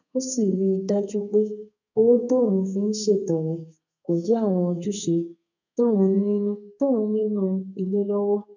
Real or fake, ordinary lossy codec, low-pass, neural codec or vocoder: fake; none; 7.2 kHz; codec, 32 kHz, 1.9 kbps, SNAC